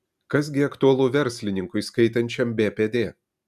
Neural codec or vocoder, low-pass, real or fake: none; 14.4 kHz; real